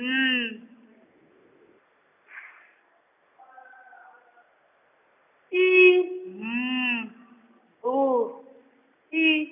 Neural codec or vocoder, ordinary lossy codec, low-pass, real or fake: none; none; 3.6 kHz; real